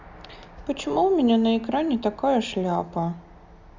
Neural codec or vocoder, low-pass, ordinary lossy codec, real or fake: none; 7.2 kHz; Opus, 64 kbps; real